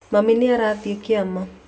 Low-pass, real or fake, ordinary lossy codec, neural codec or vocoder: none; real; none; none